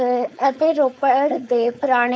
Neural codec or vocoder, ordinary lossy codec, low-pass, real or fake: codec, 16 kHz, 4.8 kbps, FACodec; none; none; fake